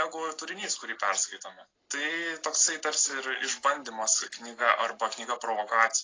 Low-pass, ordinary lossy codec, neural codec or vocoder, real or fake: 7.2 kHz; AAC, 32 kbps; none; real